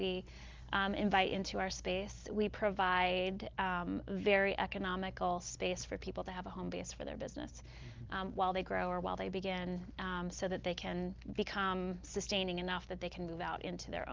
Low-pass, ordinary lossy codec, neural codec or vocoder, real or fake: 7.2 kHz; Opus, 32 kbps; none; real